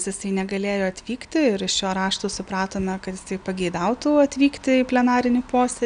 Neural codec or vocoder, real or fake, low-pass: none; real; 9.9 kHz